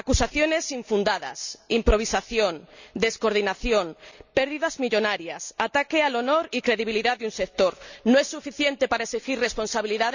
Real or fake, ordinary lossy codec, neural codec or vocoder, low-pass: real; none; none; 7.2 kHz